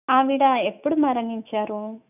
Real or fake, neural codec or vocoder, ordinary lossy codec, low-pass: fake; autoencoder, 48 kHz, 128 numbers a frame, DAC-VAE, trained on Japanese speech; none; 3.6 kHz